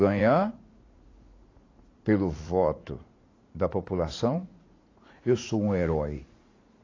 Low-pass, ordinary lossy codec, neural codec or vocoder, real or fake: 7.2 kHz; AAC, 32 kbps; vocoder, 44.1 kHz, 80 mel bands, Vocos; fake